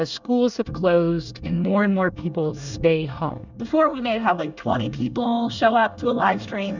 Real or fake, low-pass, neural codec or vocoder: fake; 7.2 kHz; codec, 24 kHz, 1 kbps, SNAC